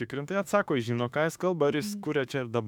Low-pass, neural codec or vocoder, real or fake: 19.8 kHz; autoencoder, 48 kHz, 32 numbers a frame, DAC-VAE, trained on Japanese speech; fake